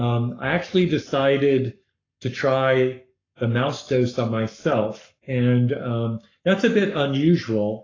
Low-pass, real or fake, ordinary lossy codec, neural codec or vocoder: 7.2 kHz; fake; AAC, 32 kbps; codec, 44.1 kHz, 7.8 kbps, Pupu-Codec